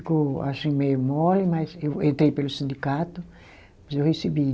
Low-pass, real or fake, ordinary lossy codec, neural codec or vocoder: none; real; none; none